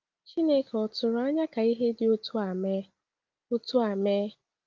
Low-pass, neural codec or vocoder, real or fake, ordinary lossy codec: 7.2 kHz; none; real; Opus, 32 kbps